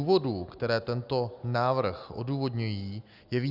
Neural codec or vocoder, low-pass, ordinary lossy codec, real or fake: none; 5.4 kHz; Opus, 64 kbps; real